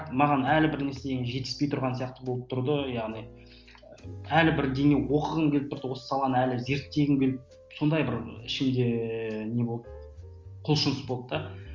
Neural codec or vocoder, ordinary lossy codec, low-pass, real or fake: none; Opus, 32 kbps; 7.2 kHz; real